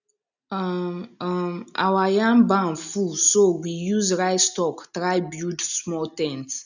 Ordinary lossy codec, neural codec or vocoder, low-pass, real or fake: none; none; 7.2 kHz; real